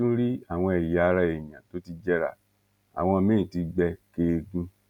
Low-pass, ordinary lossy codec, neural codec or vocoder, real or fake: 19.8 kHz; none; none; real